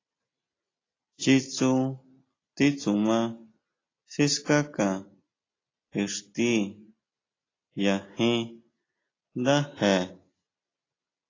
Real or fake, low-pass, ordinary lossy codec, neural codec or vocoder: real; 7.2 kHz; AAC, 32 kbps; none